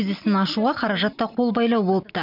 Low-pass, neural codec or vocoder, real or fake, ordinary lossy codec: 5.4 kHz; none; real; none